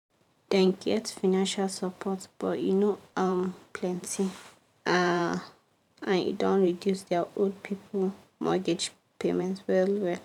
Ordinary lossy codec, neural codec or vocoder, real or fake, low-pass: none; vocoder, 44.1 kHz, 128 mel bands every 512 samples, BigVGAN v2; fake; 19.8 kHz